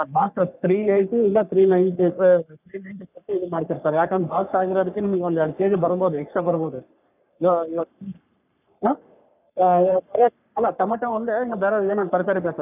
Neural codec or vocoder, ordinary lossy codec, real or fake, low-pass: codec, 44.1 kHz, 3.4 kbps, Pupu-Codec; none; fake; 3.6 kHz